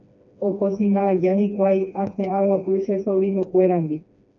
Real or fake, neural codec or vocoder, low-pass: fake; codec, 16 kHz, 2 kbps, FreqCodec, smaller model; 7.2 kHz